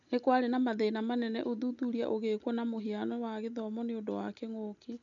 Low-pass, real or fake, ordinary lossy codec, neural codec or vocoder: 7.2 kHz; real; none; none